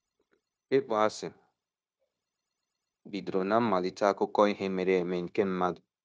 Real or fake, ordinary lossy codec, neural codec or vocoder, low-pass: fake; none; codec, 16 kHz, 0.9 kbps, LongCat-Audio-Codec; none